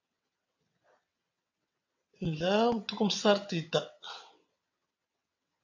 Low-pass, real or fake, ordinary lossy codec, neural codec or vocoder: 7.2 kHz; fake; AAC, 48 kbps; vocoder, 22.05 kHz, 80 mel bands, WaveNeXt